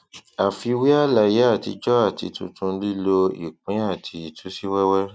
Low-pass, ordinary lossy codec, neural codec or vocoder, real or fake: none; none; none; real